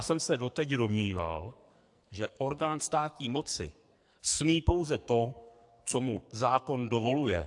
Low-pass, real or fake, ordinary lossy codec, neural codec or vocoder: 10.8 kHz; fake; AAC, 64 kbps; codec, 44.1 kHz, 2.6 kbps, SNAC